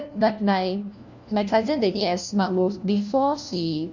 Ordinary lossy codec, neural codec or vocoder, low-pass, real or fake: none; codec, 16 kHz, 1 kbps, FunCodec, trained on LibriTTS, 50 frames a second; 7.2 kHz; fake